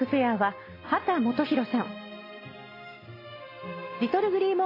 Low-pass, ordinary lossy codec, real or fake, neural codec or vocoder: 5.4 kHz; AAC, 24 kbps; real; none